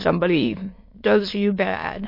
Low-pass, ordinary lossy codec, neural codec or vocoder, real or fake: 5.4 kHz; MP3, 32 kbps; autoencoder, 22.05 kHz, a latent of 192 numbers a frame, VITS, trained on many speakers; fake